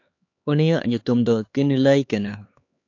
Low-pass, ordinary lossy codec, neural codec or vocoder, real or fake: 7.2 kHz; AAC, 48 kbps; codec, 16 kHz, 4 kbps, X-Codec, HuBERT features, trained on LibriSpeech; fake